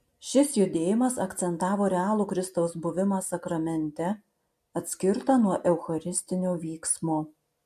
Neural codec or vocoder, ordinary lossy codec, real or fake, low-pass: none; MP3, 64 kbps; real; 14.4 kHz